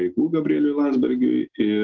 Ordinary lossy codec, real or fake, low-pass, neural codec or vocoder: Opus, 16 kbps; real; 7.2 kHz; none